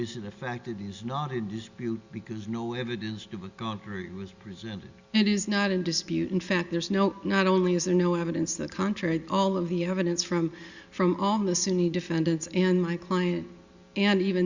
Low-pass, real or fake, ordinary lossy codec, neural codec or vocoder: 7.2 kHz; real; Opus, 64 kbps; none